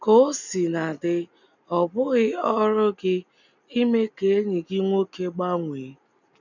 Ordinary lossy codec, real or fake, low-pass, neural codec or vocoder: none; real; 7.2 kHz; none